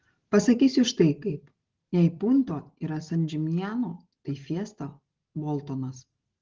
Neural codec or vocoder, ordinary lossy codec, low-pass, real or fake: none; Opus, 16 kbps; 7.2 kHz; real